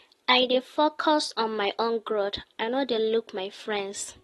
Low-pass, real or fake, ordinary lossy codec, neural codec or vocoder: 19.8 kHz; real; AAC, 32 kbps; none